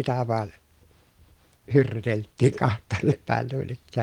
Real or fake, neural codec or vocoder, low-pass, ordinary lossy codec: real; none; 19.8 kHz; Opus, 24 kbps